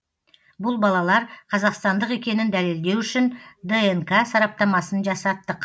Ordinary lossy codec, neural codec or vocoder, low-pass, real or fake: none; none; none; real